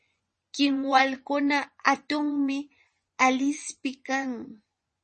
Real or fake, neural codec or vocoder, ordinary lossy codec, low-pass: fake; vocoder, 24 kHz, 100 mel bands, Vocos; MP3, 32 kbps; 10.8 kHz